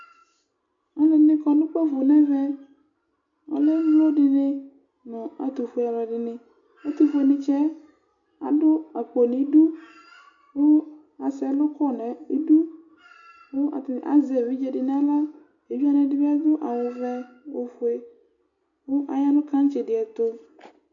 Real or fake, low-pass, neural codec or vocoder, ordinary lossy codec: real; 7.2 kHz; none; MP3, 64 kbps